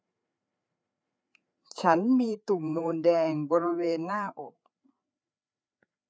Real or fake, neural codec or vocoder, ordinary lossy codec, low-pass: fake; codec, 16 kHz, 4 kbps, FreqCodec, larger model; none; none